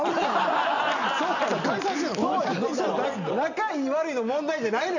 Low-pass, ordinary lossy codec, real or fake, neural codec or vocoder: 7.2 kHz; none; real; none